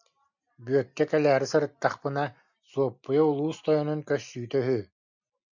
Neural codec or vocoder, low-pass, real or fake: none; 7.2 kHz; real